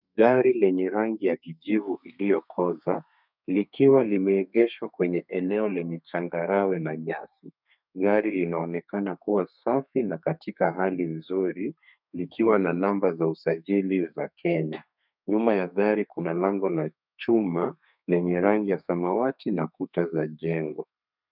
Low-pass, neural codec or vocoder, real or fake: 5.4 kHz; codec, 32 kHz, 1.9 kbps, SNAC; fake